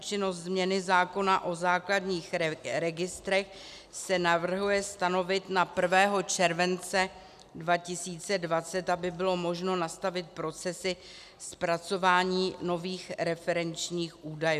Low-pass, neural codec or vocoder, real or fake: 14.4 kHz; none; real